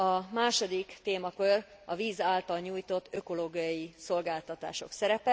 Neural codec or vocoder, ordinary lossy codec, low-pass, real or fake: none; none; none; real